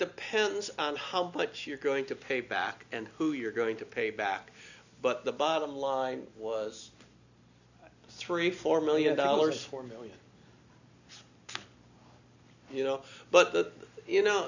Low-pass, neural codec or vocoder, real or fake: 7.2 kHz; none; real